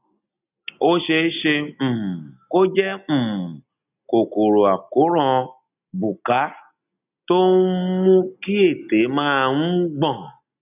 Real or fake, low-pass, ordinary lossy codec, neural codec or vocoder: real; 3.6 kHz; none; none